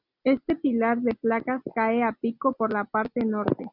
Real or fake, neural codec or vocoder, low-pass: real; none; 5.4 kHz